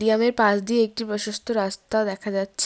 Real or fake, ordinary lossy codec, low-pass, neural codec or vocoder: real; none; none; none